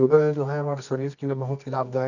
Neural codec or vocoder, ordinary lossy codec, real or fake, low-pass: codec, 24 kHz, 0.9 kbps, WavTokenizer, medium music audio release; none; fake; 7.2 kHz